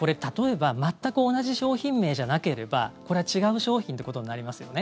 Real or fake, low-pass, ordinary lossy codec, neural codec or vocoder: real; none; none; none